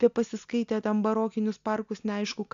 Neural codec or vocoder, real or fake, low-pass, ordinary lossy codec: none; real; 7.2 kHz; AAC, 64 kbps